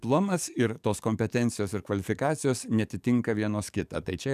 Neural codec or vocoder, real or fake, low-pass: codec, 44.1 kHz, 7.8 kbps, DAC; fake; 14.4 kHz